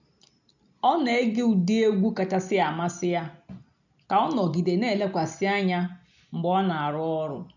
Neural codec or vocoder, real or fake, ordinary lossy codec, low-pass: none; real; none; 7.2 kHz